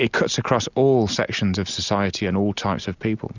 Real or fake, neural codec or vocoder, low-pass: real; none; 7.2 kHz